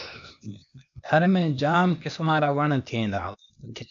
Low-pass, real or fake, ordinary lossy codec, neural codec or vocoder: 7.2 kHz; fake; MP3, 64 kbps; codec, 16 kHz, 0.8 kbps, ZipCodec